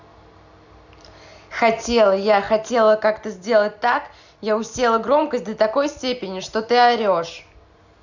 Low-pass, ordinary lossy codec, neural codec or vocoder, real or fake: 7.2 kHz; none; none; real